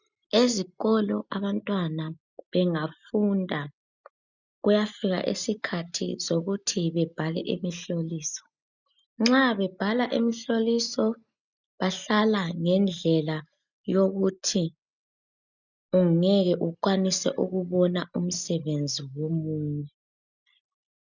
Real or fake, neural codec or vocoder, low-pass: real; none; 7.2 kHz